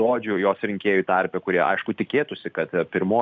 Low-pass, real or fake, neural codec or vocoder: 7.2 kHz; real; none